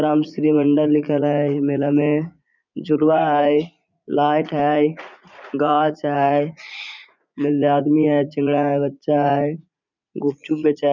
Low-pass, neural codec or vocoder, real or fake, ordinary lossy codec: 7.2 kHz; vocoder, 44.1 kHz, 80 mel bands, Vocos; fake; none